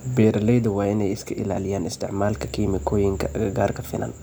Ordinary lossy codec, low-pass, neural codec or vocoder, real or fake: none; none; none; real